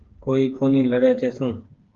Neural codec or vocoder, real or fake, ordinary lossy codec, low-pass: codec, 16 kHz, 4 kbps, FreqCodec, smaller model; fake; Opus, 32 kbps; 7.2 kHz